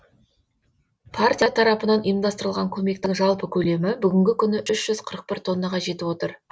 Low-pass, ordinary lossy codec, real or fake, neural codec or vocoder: none; none; real; none